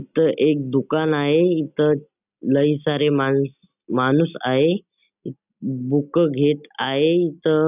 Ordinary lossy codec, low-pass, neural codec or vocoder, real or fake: none; 3.6 kHz; none; real